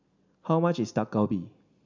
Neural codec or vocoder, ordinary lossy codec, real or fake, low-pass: none; none; real; 7.2 kHz